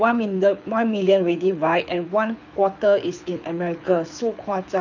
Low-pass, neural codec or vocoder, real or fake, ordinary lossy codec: 7.2 kHz; codec, 24 kHz, 6 kbps, HILCodec; fake; none